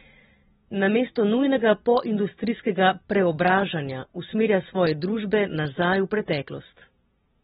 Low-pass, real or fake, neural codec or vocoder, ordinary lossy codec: 19.8 kHz; real; none; AAC, 16 kbps